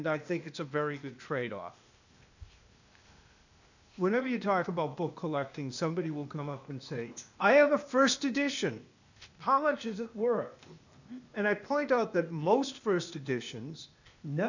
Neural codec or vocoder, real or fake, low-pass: codec, 16 kHz, 0.8 kbps, ZipCodec; fake; 7.2 kHz